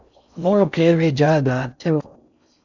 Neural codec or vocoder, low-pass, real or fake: codec, 16 kHz in and 24 kHz out, 0.6 kbps, FocalCodec, streaming, 4096 codes; 7.2 kHz; fake